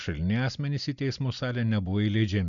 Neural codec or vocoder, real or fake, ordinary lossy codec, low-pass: none; real; MP3, 96 kbps; 7.2 kHz